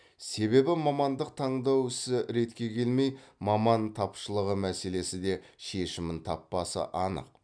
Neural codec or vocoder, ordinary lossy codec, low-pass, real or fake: none; none; 9.9 kHz; real